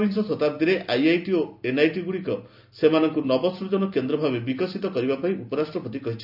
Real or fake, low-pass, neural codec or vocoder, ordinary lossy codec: real; 5.4 kHz; none; none